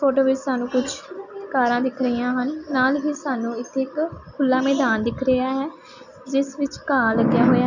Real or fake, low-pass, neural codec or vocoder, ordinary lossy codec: real; 7.2 kHz; none; none